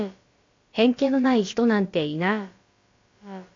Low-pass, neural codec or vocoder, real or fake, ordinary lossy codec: 7.2 kHz; codec, 16 kHz, about 1 kbps, DyCAST, with the encoder's durations; fake; AAC, 48 kbps